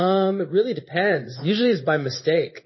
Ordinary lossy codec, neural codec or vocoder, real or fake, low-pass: MP3, 24 kbps; codec, 16 kHz in and 24 kHz out, 1 kbps, XY-Tokenizer; fake; 7.2 kHz